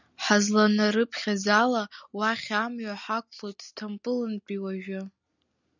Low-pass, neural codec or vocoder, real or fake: 7.2 kHz; none; real